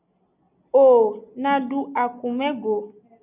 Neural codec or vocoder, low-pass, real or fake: none; 3.6 kHz; real